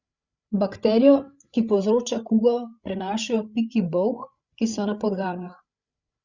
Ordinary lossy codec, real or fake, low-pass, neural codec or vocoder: Opus, 64 kbps; fake; 7.2 kHz; codec, 16 kHz, 8 kbps, FreqCodec, larger model